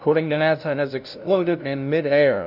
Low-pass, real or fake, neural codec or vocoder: 5.4 kHz; fake; codec, 16 kHz, 0.5 kbps, FunCodec, trained on LibriTTS, 25 frames a second